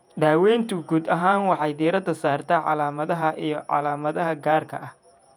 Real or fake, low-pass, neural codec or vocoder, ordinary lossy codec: fake; 19.8 kHz; vocoder, 48 kHz, 128 mel bands, Vocos; none